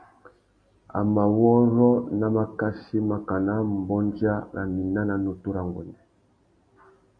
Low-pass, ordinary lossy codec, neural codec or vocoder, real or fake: 9.9 kHz; MP3, 48 kbps; none; real